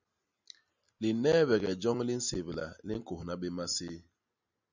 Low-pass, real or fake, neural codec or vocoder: 7.2 kHz; real; none